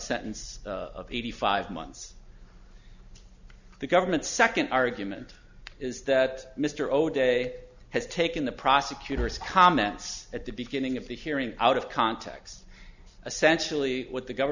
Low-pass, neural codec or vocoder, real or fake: 7.2 kHz; none; real